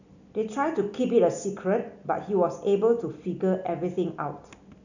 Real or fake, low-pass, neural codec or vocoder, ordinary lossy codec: real; 7.2 kHz; none; none